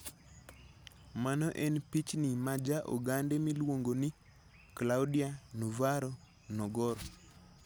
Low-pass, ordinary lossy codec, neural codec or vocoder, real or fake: none; none; none; real